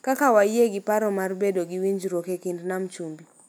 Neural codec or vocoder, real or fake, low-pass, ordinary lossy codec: none; real; none; none